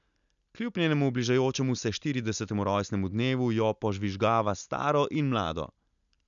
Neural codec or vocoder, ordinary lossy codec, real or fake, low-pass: none; none; real; 7.2 kHz